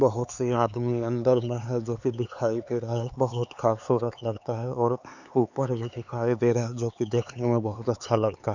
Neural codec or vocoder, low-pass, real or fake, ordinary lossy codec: codec, 16 kHz, 4 kbps, X-Codec, HuBERT features, trained on LibriSpeech; 7.2 kHz; fake; none